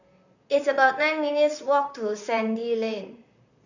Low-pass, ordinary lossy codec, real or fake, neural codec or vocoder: 7.2 kHz; none; fake; vocoder, 44.1 kHz, 128 mel bands, Pupu-Vocoder